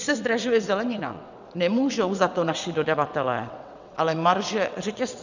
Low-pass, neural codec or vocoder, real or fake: 7.2 kHz; vocoder, 22.05 kHz, 80 mel bands, WaveNeXt; fake